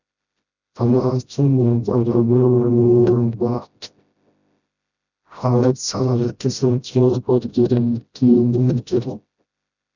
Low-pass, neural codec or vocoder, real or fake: 7.2 kHz; codec, 16 kHz, 0.5 kbps, FreqCodec, smaller model; fake